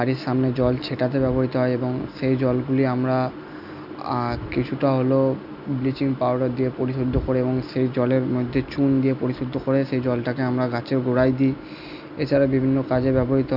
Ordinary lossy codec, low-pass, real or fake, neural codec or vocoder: none; 5.4 kHz; real; none